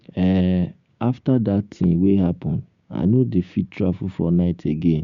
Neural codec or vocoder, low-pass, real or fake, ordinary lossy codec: codec, 16 kHz, 6 kbps, DAC; 7.2 kHz; fake; Opus, 64 kbps